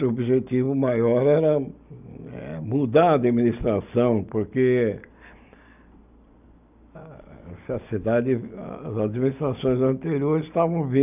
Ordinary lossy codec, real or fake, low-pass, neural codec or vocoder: none; real; 3.6 kHz; none